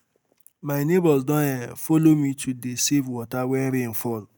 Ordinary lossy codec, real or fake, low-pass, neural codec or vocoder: none; real; none; none